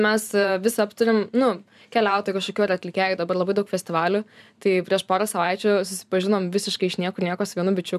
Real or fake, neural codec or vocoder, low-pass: fake; vocoder, 44.1 kHz, 128 mel bands every 512 samples, BigVGAN v2; 14.4 kHz